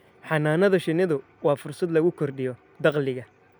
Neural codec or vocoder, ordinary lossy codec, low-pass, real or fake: none; none; none; real